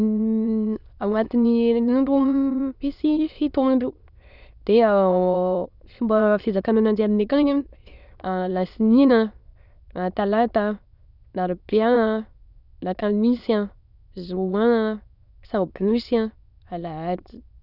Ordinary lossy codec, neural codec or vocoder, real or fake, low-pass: none; autoencoder, 22.05 kHz, a latent of 192 numbers a frame, VITS, trained on many speakers; fake; 5.4 kHz